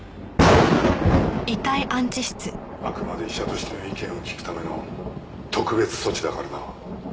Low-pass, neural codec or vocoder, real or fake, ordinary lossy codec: none; none; real; none